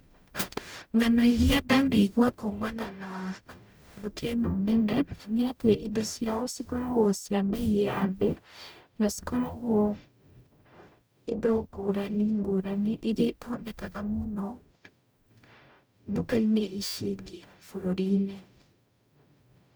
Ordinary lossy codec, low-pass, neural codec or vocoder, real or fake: none; none; codec, 44.1 kHz, 0.9 kbps, DAC; fake